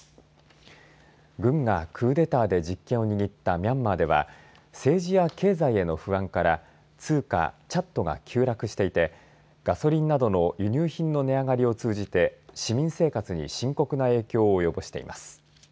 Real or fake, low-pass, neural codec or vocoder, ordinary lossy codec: real; none; none; none